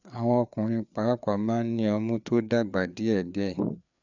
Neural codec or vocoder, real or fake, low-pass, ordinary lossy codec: codec, 16 kHz, 4 kbps, FreqCodec, larger model; fake; 7.2 kHz; none